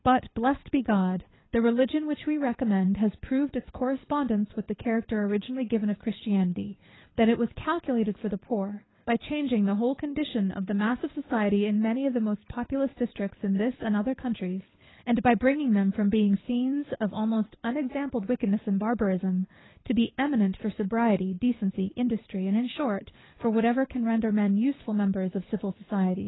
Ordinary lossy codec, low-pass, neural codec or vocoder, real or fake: AAC, 16 kbps; 7.2 kHz; codec, 24 kHz, 6 kbps, HILCodec; fake